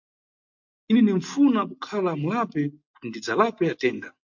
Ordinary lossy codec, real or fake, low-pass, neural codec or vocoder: MP3, 48 kbps; real; 7.2 kHz; none